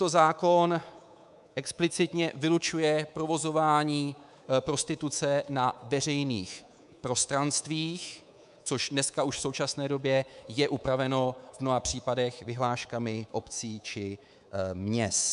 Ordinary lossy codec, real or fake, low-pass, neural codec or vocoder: MP3, 96 kbps; fake; 10.8 kHz; codec, 24 kHz, 3.1 kbps, DualCodec